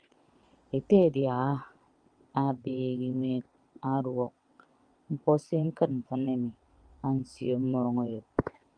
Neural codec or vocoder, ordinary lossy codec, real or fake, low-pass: vocoder, 22.05 kHz, 80 mel bands, WaveNeXt; Opus, 32 kbps; fake; 9.9 kHz